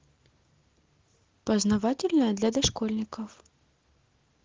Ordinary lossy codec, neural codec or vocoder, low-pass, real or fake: Opus, 16 kbps; none; 7.2 kHz; real